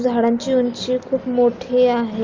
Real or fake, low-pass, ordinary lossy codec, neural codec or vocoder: real; 7.2 kHz; Opus, 32 kbps; none